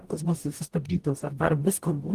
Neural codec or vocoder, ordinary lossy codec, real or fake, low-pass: codec, 44.1 kHz, 0.9 kbps, DAC; Opus, 24 kbps; fake; 14.4 kHz